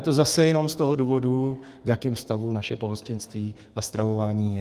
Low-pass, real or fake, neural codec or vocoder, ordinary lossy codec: 14.4 kHz; fake; codec, 32 kHz, 1.9 kbps, SNAC; Opus, 32 kbps